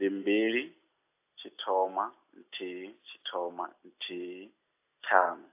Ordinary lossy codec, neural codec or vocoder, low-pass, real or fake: AAC, 24 kbps; none; 3.6 kHz; real